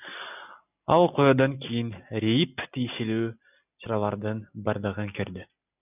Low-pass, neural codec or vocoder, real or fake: 3.6 kHz; none; real